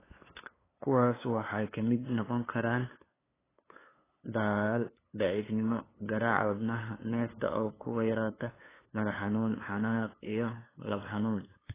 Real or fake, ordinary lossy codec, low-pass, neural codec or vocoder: fake; AAC, 16 kbps; 3.6 kHz; codec, 16 kHz, 2 kbps, FunCodec, trained on LibriTTS, 25 frames a second